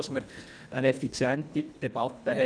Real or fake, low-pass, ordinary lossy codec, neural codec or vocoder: fake; 9.9 kHz; none; codec, 24 kHz, 1.5 kbps, HILCodec